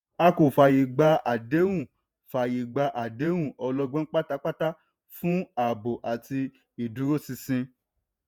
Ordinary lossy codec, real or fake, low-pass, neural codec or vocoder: none; fake; none; vocoder, 48 kHz, 128 mel bands, Vocos